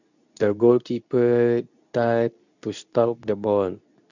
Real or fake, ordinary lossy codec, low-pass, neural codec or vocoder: fake; none; 7.2 kHz; codec, 24 kHz, 0.9 kbps, WavTokenizer, medium speech release version 2